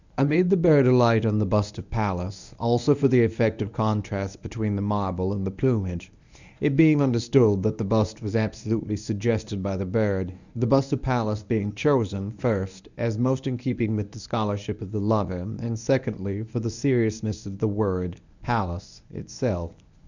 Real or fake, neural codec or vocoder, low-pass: fake; codec, 24 kHz, 0.9 kbps, WavTokenizer, medium speech release version 1; 7.2 kHz